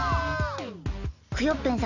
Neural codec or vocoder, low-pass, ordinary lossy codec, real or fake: none; 7.2 kHz; none; real